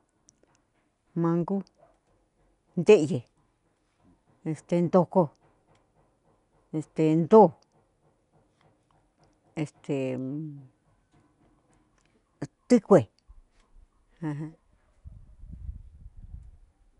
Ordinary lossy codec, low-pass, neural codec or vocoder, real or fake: none; 10.8 kHz; none; real